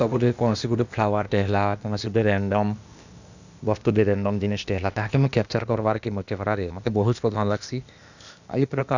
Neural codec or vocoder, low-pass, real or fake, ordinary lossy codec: codec, 16 kHz, 0.8 kbps, ZipCodec; 7.2 kHz; fake; none